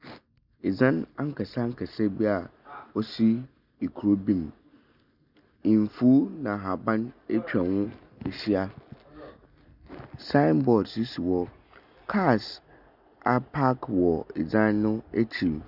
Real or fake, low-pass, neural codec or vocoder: real; 5.4 kHz; none